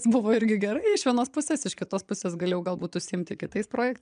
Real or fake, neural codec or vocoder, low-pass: fake; vocoder, 22.05 kHz, 80 mel bands, Vocos; 9.9 kHz